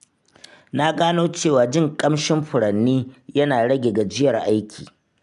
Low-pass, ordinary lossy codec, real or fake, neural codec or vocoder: 10.8 kHz; none; real; none